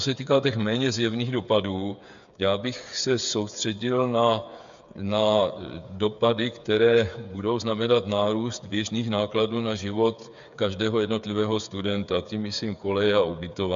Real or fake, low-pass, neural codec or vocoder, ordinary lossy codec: fake; 7.2 kHz; codec, 16 kHz, 8 kbps, FreqCodec, smaller model; MP3, 64 kbps